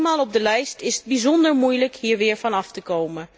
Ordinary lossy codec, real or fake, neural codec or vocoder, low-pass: none; real; none; none